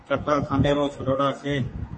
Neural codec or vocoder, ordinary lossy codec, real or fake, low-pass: codec, 32 kHz, 1.9 kbps, SNAC; MP3, 32 kbps; fake; 10.8 kHz